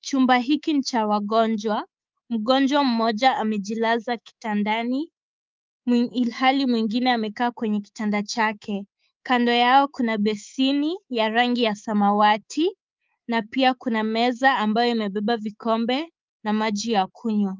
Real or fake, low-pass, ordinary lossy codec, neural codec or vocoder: fake; 7.2 kHz; Opus, 24 kbps; codec, 24 kHz, 3.1 kbps, DualCodec